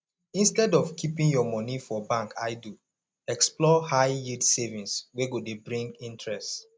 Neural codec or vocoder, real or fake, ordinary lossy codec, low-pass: none; real; none; none